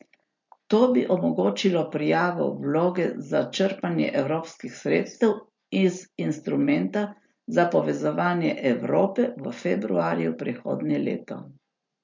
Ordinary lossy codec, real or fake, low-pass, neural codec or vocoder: MP3, 48 kbps; real; 7.2 kHz; none